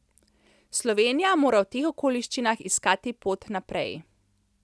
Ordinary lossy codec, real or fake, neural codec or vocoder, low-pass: none; real; none; none